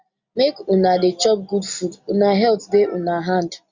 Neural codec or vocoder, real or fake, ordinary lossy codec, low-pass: none; real; Opus, 64 kbps; 7.2 kHz